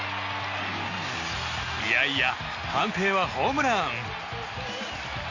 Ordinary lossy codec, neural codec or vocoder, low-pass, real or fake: Opus, 64 kbps; none; 7.2 kHz; real